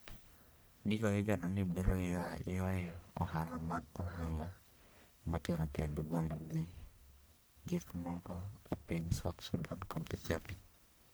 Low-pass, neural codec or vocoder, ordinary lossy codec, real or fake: none; codec, 44.1 kHz, 1.7 kbps, Pupu-Codec; none; fake